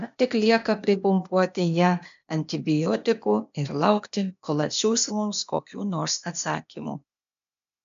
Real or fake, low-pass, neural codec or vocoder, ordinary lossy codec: fake; 7.2 kHz; codec, 16 kHz, 0.8 kbps, ZipCodec; MP3, 64 kbps